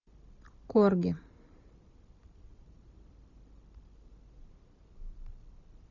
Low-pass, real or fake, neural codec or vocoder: 7.2 kHz; real; none